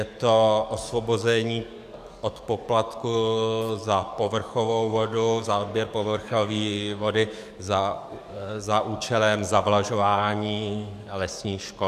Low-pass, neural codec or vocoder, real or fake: 14.4 kHz; codec, 44.1 kHz, 7.8 kbps, DAC; fake